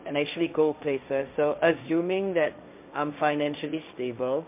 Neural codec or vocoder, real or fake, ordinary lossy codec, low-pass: codec, 16 kHz, 1.1 kbps, Voila-Tokenizer; fake; MP3, 32 kbps; 3.6 kHz